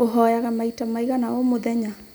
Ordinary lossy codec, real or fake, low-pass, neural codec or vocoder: none; real; none; none